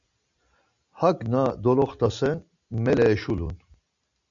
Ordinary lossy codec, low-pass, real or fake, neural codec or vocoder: MP3, 96 kbps; 7.2 kHz; real; none